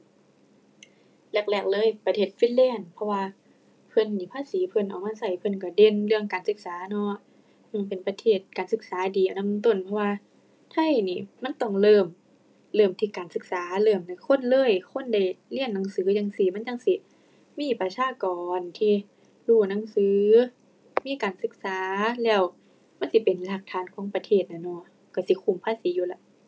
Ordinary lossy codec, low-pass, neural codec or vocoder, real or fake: none; none; none; real